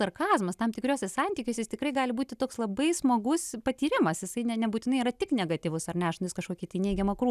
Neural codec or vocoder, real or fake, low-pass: none; real; 14.4 kHz